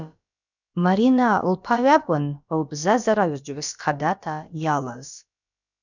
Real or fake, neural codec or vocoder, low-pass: fake; codec, 16 kHz, about 1 kbps, DyCAST, with the encoder's durations; 7.2 kHz